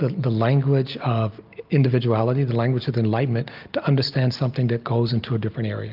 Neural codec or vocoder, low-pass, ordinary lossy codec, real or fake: none; 5.4 kHz; Opus, 24 kbps; real